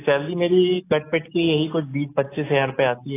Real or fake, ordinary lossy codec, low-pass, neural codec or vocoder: real; AAC, 24 kbps; 3.6 kHz; none